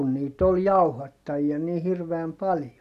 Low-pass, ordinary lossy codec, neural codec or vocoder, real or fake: 14.4 kHz; none; none; real